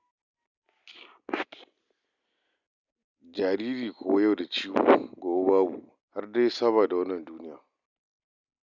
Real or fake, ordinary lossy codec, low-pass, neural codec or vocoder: real; none; 7.2 kHz; none